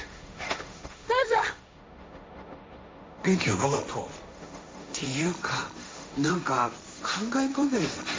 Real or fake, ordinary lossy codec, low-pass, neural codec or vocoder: fake; none; none; codec, 16 kHz, 1.1 kbps, Voila-Tokenizer